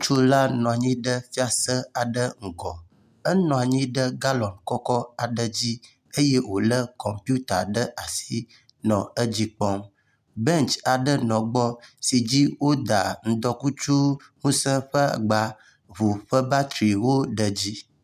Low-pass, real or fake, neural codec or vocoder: 14.4 kHz; real; none